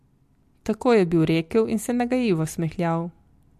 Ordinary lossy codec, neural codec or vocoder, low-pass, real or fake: MP3, 64 kbps; none; 14.4 kHz; real